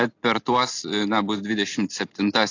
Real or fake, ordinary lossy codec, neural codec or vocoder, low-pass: real; AAC, 48 kbps; none; 7.2 kHz